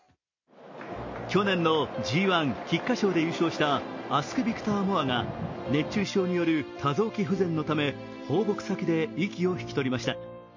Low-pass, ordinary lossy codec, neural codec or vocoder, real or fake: 7.2 kHz; MP3, 32 kbps; none; real